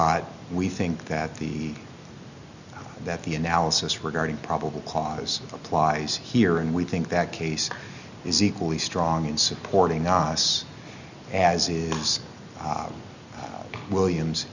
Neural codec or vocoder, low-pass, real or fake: none; 7.2 kHz; real